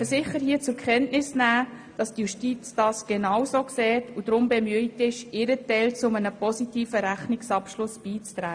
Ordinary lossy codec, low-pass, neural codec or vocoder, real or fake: AAC, 64 kbps; 9.9 kHz; none; real